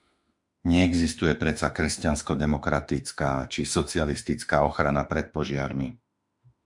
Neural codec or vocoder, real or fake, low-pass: autoencoder, 48 kHz, 32 numbers a frame, DAC-VAE, trained on Japanese speech; fake; 10.8 kHz